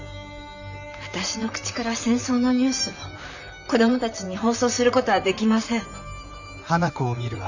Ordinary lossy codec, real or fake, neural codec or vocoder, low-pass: none; fake; vocoder, 44.1 kHz, 128 mel bands, Pupu-Vocoder; 7.2 kHz